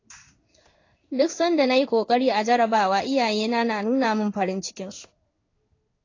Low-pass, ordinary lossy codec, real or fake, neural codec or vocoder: 7.2 kHz; AAC, 32 kbps; fake; codec, 16 kHz in and 24 kHz out, 1 kbps, XY-Tokenizer